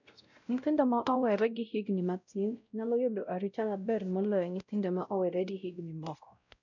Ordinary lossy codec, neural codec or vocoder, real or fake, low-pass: none; codec, 16 kHz, 0.5 kbps, X-Codec, WavLM features, trained on Multilingual LibriSpeech; fake; 7.2 kHz